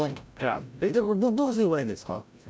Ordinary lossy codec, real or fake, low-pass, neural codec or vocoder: none; fake; none; codec, 16 kHz, 0.5 kbps, FreqCodec, larger model